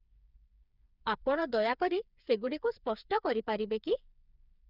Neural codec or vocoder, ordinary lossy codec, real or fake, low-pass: codec, 16 kHz, 4 kbps, FreqCodec, smaller model; none; fake; 5.4 kHz